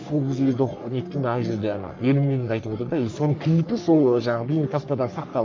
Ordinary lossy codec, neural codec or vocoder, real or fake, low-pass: MP3, 48 kbps; codec, 44.1 kHz, 3.4 kbps, Pupu-Codec; fake; 7.2 kHz